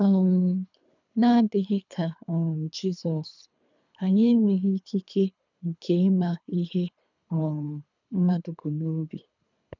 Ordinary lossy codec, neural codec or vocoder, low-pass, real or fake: none; codec, 24 kHz, 3 kbps, HILCodec; 7.2 kHz; fake